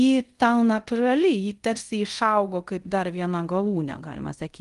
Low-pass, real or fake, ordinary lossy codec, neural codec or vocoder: 10.8 kHz; fake; Opus, 24 kbps; codec, 24 kHz, 0.5 kbps, DualCodec